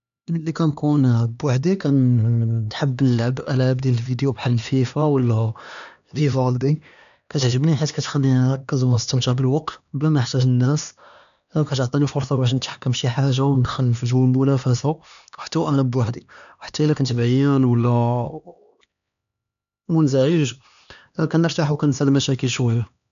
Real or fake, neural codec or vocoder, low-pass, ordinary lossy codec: fake; codec, 16 kHz, 2 kbps, X-Codec, HuBERT features, trained on LibriSpeech; 7.2 kHz; none